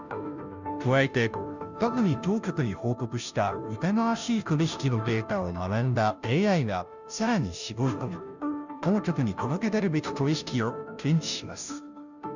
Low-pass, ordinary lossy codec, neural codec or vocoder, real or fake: 7.2 kHz; none; codec, 16 kHz, 0.5 kbps, FunCodec, trained on Chinese and English, 25 frames a second; fake